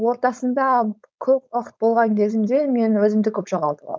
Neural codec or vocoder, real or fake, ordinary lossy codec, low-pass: codec, 16 kHz, 4.8 kbps, FACodec; fake; none; none